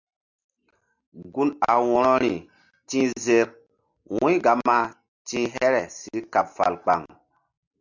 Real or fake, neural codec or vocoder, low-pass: real; none; 7.2 kHz